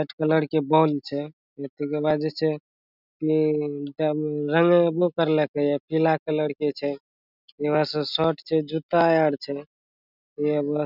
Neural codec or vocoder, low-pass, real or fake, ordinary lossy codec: none; 5.4 kHz; real; none